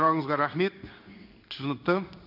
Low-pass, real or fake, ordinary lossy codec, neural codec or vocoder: 5.4 kHz; fake; none; codec, 16 kHz in and 24 kHz out, 1 kbps, XY-Tokenizer